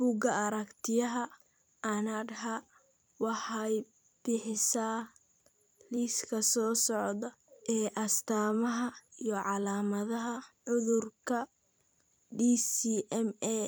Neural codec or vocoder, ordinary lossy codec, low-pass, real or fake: vocoder, 44.1 kHz, 128 mel bands every 256 samples, BigVGAN v2; none; none; fake